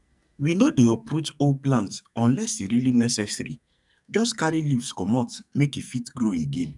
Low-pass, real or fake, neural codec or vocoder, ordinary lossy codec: 10.8 kHz; fake; codec, 44.1 kHz, 2.6 kbps, SNAC; none